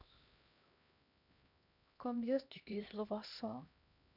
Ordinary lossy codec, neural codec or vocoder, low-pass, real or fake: none; codec, 16 kHz, 1 kbps, X-Codec, HuBERT features, trained on LibriSpeech; 5.4 kHz; fake